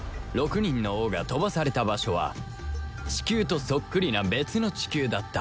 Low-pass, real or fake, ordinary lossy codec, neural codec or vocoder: none; real; none; none